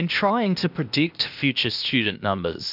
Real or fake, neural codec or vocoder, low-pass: fake; codec, 16 kHz, 0.8 kbps, ZipCodec; 5.4 kHz